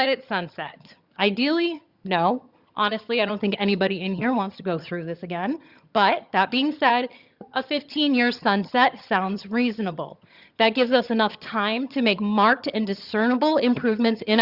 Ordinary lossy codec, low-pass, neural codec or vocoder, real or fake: Opus, 64 kbps; 5.4 kHz; vocoder, 22.05 kHz, 80 mel bands, HiFi-GAN; fake